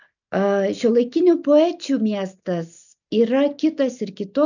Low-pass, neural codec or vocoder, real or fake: 7.2 kHz; none; real